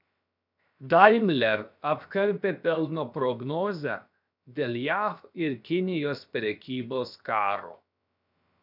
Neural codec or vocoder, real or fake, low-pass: codec, 16 kHz, 0.7 kbps, FocalCodec; fake; 5.4 kHz